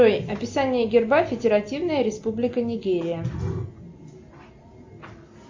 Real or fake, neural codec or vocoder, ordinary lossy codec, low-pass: real; none; MP3, 64 kbps; 7.2 kHz